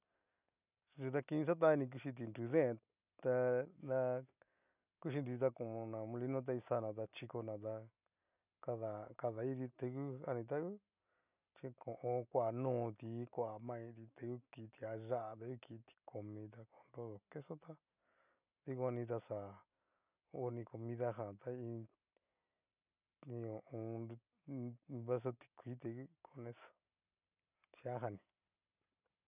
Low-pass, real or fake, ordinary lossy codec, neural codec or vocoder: 3.6 kHz; real; none; none